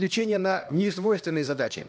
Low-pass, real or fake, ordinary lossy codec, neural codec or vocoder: none; fake; none; codec, 16 kHz, 1 kbps, X-Codec, HuBERT features, trained on LibriSpeech